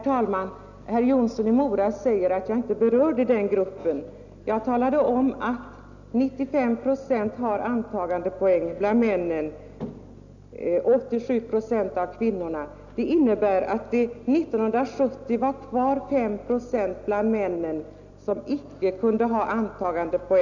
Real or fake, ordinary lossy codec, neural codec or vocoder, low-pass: real; none; none; 7.2 kHz